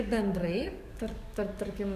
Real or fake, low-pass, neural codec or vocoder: fake; 14.4 kHz; codec, 44.1 kHz, 7.8 kbps, DAC